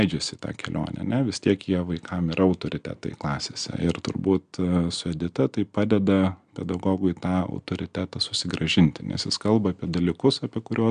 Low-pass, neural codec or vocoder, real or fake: 9.9 kHz; none; real